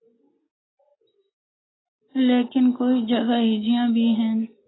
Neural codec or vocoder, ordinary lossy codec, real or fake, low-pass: none; AAC, 16 kbps; real; 7.2 kHz